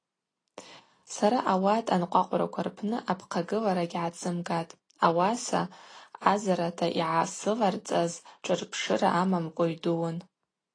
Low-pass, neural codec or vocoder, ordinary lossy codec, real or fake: 9.9 kHz; none; AAC, 32 kbps; real